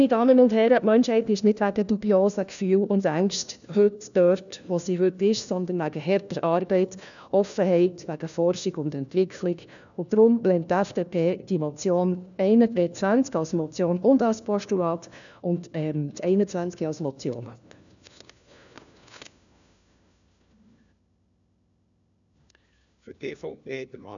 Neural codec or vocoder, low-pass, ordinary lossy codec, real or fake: codec, 16 kHz, 1 kbps, FunCodec, trained on LibriTTS, 50 frames a second; 7.2 kHz; none; fake